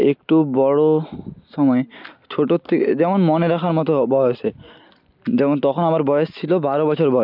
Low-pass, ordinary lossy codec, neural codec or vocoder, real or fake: 5.4 kHz; none; none; real